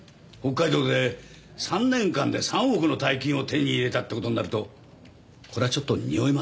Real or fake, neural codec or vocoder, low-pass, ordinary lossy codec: real; none; none; none